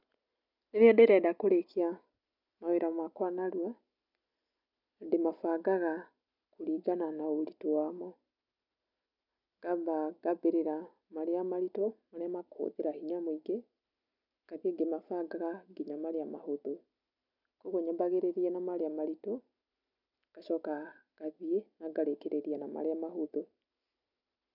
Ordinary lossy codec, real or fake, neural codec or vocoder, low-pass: none; real; none; 5.4 kHz